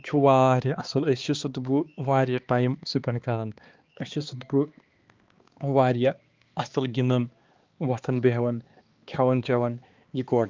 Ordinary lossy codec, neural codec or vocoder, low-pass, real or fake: Opus, 24 kbps; codec, 16 kHz, 2 kbps, X-Codec, HuBERT features, trained on balanced general audio; 7.2 kHz; fake